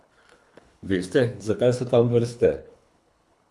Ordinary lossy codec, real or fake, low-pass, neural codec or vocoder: none; fake; none; codec, 24 kHz, 3 kbps, HILCodec